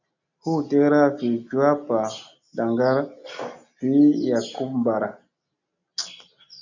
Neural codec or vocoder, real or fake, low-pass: none; real; 7.2 kHz